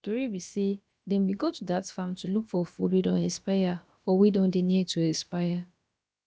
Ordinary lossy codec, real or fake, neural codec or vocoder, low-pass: none; fake; codec, 16 kHz, about 1 kbps, DyCAST, with the encoder's durations; none